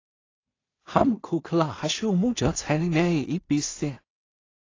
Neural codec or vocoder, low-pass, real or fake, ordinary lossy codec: codec, 16 kHz in and 24 kHz out, 0.4 kbps, LongCat-Audio-Codec, two codebook decoder; 7.2 kHz; fake; AAC, 32 kbps